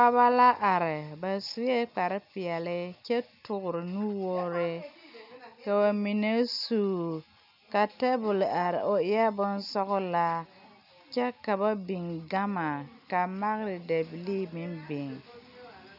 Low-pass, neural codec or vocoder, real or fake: 5.4 kHz; none; real